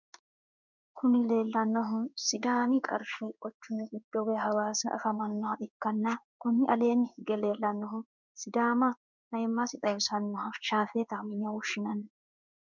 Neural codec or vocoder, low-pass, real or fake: codec, 16 kHz in and 24 kHz out, 1 kbps, XY-Tokenizer; 7.2 kHz; fake